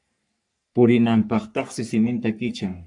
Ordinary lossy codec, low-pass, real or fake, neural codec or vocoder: AAC, 64 kbps; 10.8 kHz; fake; codec, 44.1 kHz, 3.4 kbps, Pupu-Codec